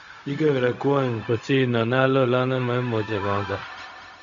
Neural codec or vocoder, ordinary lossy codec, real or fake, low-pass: codec, 16 kHz, 0.4 kbps, LongCat-Audio-Codec; none; fake; 7.2 kHz